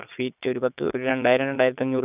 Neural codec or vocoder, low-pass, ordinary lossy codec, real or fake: vocoder, 22.05 kHz, 80 mel bands, Vocos; 3.6 kHz; none; fake